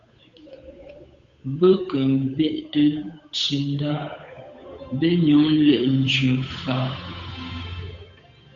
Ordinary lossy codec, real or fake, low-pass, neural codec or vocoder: AAC, 64 kbps; fake; 7.2 kHz; codec, 16 kHz, 8 kbps, FunCodec, trained on Chinese and English, 25 frames a second